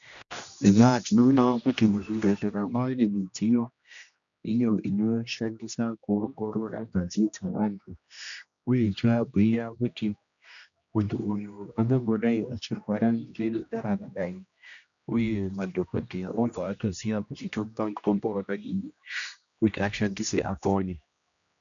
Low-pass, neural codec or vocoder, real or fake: 7.2 kHz; codec, 16 kHz, 1 kbps, X-Codec, HuBERT features, trained on general audio; fake